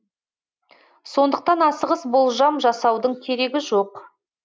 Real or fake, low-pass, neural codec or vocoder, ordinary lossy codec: real; none; none; none